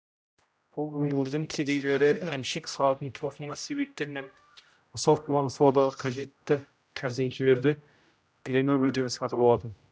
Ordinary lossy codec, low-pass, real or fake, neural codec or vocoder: none; none; fake; codec, 16 kHz, 0.5 kbps, X-Codec, HuBERT features, trained on general audio